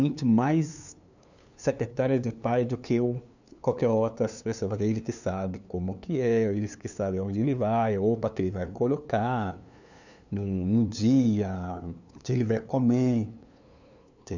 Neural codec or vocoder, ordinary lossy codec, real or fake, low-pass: codec, 16 kHz, 2 kbps, FunCodec, trained on LibriTTS, 25 frames a second; none; fake; 7.2 kHz